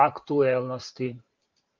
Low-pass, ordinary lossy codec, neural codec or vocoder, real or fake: 7.2 kHz; Opus, 24 kbps; vocoder, 44.1 kHz, 128 mel bands every 512 samples, BigVGAN v2; fake